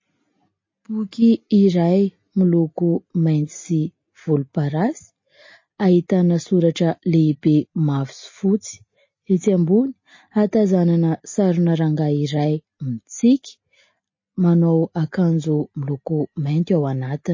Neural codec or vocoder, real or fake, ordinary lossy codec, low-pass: none; real; MP3, 32 kbps; 7.2 kHz